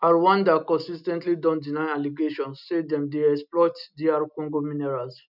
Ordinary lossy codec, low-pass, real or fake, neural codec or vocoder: none; 5.4 kHz; real; none